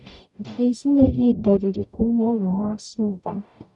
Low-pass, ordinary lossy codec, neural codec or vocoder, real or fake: 10.8 kHz; none; codec, 44.1 kHz, 0.9 kbps, DAC; fake